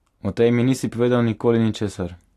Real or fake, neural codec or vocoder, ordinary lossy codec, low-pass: real; none; AAC, 64 kbps; 14.4 kHz